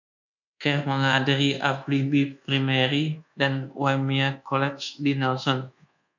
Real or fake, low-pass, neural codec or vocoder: fake; 7.2 kHz; codec, 24 kHz, 1.2 kbps, DualCodec